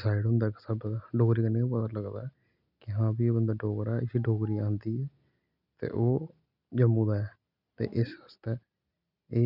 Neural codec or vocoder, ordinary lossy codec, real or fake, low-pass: none; none; real; 5.4 kHz